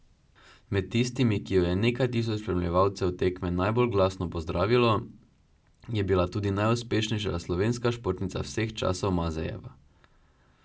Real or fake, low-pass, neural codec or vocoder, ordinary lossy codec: real; none; none; none